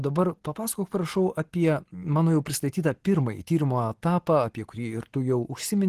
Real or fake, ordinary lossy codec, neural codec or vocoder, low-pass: real; Opus, 16 kbps; none; 14.4 kHz